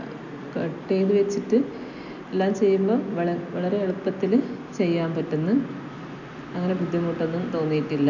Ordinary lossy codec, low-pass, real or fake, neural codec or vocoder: none; 7.2 kHz; real; none